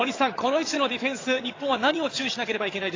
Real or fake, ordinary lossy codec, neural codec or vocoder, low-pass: fake; AAC, 32 kbps; vocoder, 22.05 kHz, 80 mel bands, HiFi-GAN; 7.2 kHz